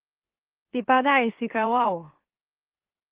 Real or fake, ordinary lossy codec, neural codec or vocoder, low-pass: fake; Opus, 16 kbps; autoencoder, 44.1 kHz, a latent of 192 numbers a frame, MeloTTS; 3.6 kHz